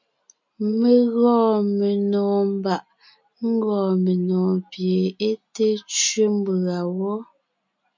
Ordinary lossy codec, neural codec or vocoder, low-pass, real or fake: MP3, 64 kbps; none; 7.2 kHz; real